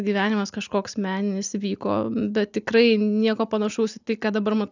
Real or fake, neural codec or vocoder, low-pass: real; none; 7.2 kHz